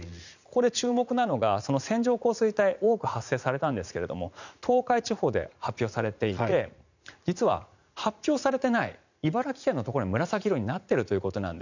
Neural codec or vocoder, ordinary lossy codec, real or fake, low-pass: vocoder, 44.1 kHz, 80 mel bands, Vocos; none; fake; 7.2 kHz